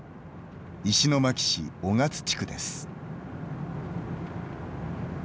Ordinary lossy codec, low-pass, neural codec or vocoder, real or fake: none; none; none; real